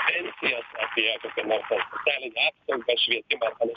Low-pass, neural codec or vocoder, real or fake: 7.2 kHz; none; real